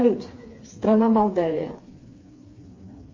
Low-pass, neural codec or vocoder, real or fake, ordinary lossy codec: 7.2 kHz; codec, 16 kHz, 4 kbps, FreqCodec, smaller model; fake; MP3, 32 kbps